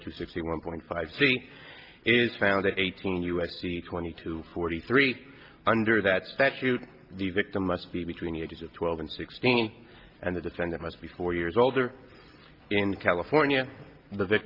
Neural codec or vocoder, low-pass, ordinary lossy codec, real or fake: none; 5.4 kHz; Opus, 24 kbps; real